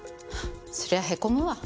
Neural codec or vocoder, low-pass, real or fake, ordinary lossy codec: none; none; real; none